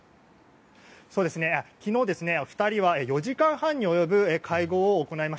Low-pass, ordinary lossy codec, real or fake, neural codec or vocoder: none; none; real; none